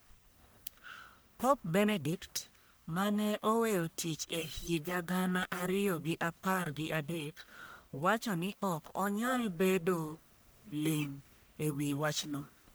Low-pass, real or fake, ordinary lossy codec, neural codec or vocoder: none; fake; none; codec, 44.1 kHz, 1.7 kbps, Pupu-Codec